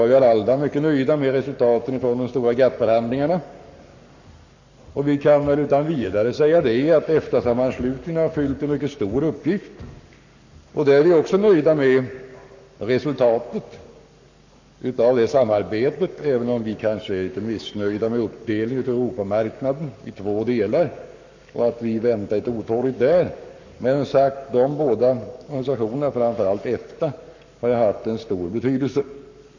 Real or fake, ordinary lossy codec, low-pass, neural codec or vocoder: fake; none; 7.2 kHz; codec, 44.1 kHz, 7.8 kbps, Pupu-Codec